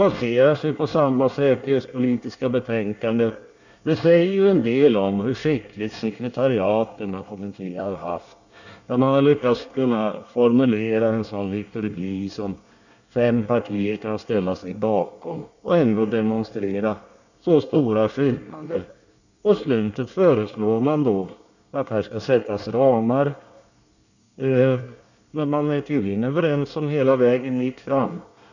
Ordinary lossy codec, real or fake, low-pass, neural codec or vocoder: none; fake; 7.2 kHz; codec, 24 kHz, 1 kbps, SNAC